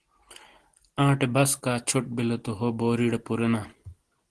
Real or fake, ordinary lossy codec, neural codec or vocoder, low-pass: real; Opus, 16 kbps; none; 10.8 kHz